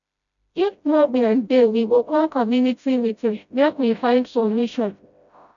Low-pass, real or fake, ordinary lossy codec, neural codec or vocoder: 7.2 kHz; fake; none; codec, 16 kHz, 0.5 kbps, FreqCodec, smaller model